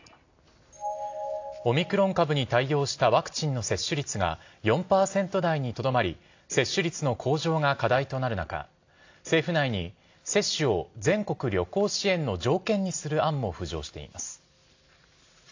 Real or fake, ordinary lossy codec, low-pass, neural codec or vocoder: real; AAC, 48 kbps; 7.2 kHz; none